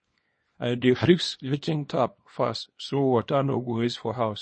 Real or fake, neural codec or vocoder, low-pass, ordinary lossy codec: fake; codec, 24 kHz, 0.9 kbps, WavTokenizer, small release; 9.9 kHz; MP3, 32 kbps